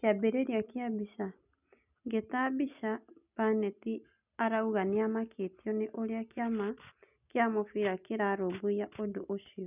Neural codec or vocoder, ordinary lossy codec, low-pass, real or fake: none; none; 3.6 kHz; real